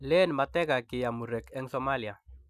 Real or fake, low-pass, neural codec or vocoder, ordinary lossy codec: real; 14.4 kHz; none; none